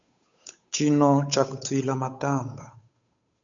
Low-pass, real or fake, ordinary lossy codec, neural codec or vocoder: 7.2 kHz; fake; MP3, 64 kbps; codec, 16 kHz, 8 kbps, FunCodec, trained on Chinese and English, 25 frames a second